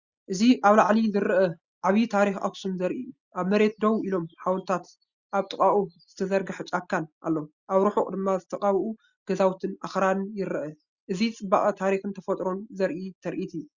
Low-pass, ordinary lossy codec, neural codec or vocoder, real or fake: 7.2 kHz; Opus, 64 kbps; none; real